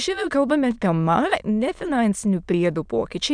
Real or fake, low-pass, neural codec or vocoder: fake; 9.9 kHz; autoencoder, 22.05 kHz, a latent of 192 numbers a frame, VITS, trained on many speakers